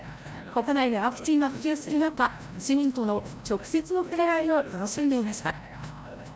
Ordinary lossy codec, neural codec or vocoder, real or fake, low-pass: none; codec, 16 kHz, 0.5 kbps, FreqCodec, larger model; fake; none